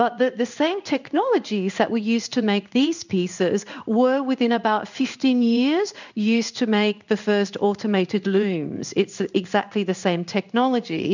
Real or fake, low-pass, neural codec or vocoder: fake; 7.2 kHz; codec, 16 kHz in and 24 kHz out, 1 kbps, XY-Tokenizer